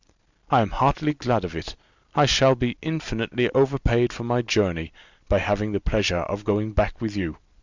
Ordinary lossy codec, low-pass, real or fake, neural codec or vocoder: Opus, 64 kbps; 7.2 kHz; real; none